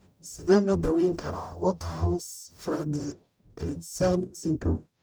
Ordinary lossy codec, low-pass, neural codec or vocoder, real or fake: none; none; codec, 44.1 kHz, 0.9 kbps, DAC; fake